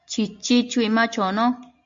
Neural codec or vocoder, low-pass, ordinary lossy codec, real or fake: none; 7.2 kHz; MP3, 48 kbps; real